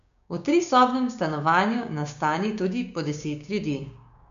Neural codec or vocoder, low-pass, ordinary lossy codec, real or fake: codec, 16 kHz, 6 kbps, DAC; 7.2 kHz; none; fake